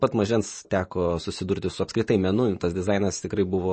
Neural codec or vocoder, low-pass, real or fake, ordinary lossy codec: vocoder, 44.1 kHz, 128 mel bands every 512 samples, BigVGAN v2; 9.9 kHz; fake; MP3, 32 kbps